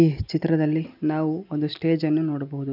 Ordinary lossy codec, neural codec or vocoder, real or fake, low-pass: none; none; real; 5.4 kHz